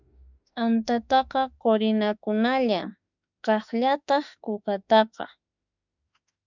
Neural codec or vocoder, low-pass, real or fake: autoencoder, 48 kHz, 32 numbers a frame, DAC-VAE, trained on Japanese speech; 7.2 kHz; fake